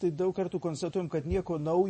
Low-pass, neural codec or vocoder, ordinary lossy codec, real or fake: 9.9 kHz; vocoder, 24 kHz, 100 mel bands, Vocos; MP3, 32 kbps; fake